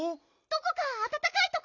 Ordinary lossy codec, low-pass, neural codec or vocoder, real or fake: none; 7.2 kHz; none; real